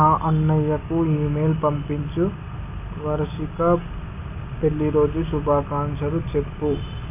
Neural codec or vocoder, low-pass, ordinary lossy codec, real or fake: none; 3.6 kHz; AAC, 32 kbps; real